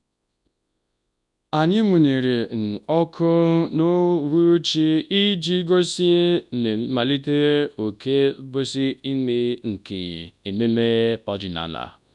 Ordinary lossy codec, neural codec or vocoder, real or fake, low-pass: none; codec, 24 kHz, 0.9 kbps, WavTokenizer, large speech release; fake; 10.8 kHz